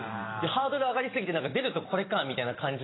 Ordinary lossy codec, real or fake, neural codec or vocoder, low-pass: AAC, 16 kbps; real; none; 7.2 kHz